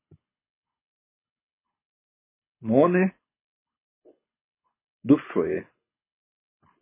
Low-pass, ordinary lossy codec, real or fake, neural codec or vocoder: 3.6 kHz; MP3, 16 kbps; fake; codec, 24 kHz, 6 kbps, HILCodec